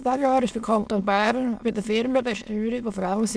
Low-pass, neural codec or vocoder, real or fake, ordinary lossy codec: none; autoencoder, 22.05 kHz, a latent of 192 numbers a frame, VITS, trained on many speakers; fake; none